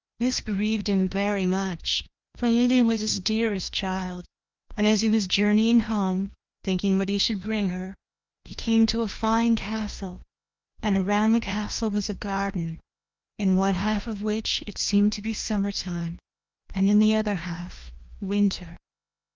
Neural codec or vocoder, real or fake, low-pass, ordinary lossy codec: codec, 16 kHz, 1 kbps, FreqCodec, larger model; fake; 7.2 kHz; Opus, 24 kbps